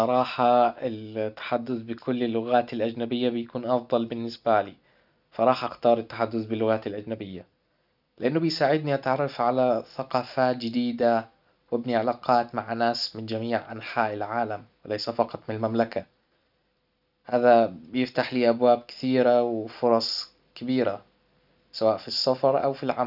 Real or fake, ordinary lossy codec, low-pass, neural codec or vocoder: real; none; 5.4 kHz; none